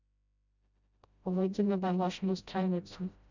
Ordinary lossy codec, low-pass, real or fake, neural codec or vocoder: none; 7.2 kHz; fake; codec, 16 kHz, 0.5 kbps, FreqCodec, smaller model